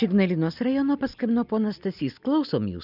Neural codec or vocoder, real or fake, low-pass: none; real; 5.4 kHz